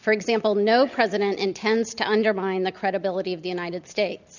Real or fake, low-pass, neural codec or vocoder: real; 7.2 kHz; none